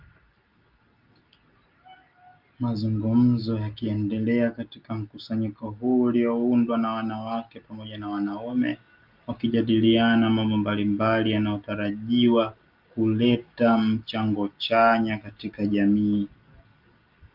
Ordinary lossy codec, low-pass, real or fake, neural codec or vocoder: Opus, 32 kbps; 5.4 kHz; real; none